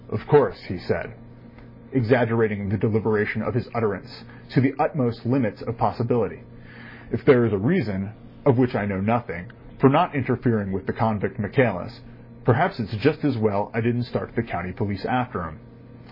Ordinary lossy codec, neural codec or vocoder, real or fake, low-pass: MP3, 24 kbps; none; real; 5.4 kHz